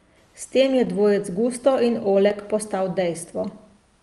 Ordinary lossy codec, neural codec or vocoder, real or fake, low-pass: Opus, 32 kbps; none; real; 10.8 kHz